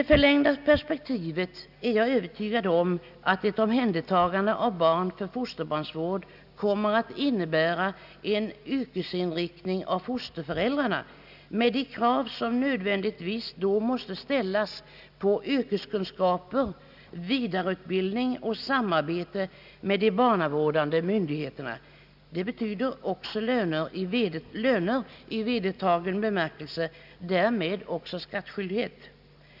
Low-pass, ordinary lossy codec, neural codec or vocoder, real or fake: 5.4 kHz; none; none; real